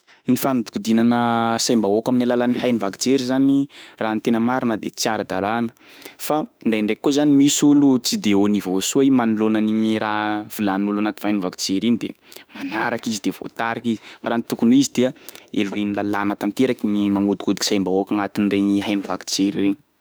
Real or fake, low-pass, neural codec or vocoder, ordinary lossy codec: fake; none; autoencoder, 48 kHz, 32 numbers a frame, DAC-VAE, trained on Japanese speech; none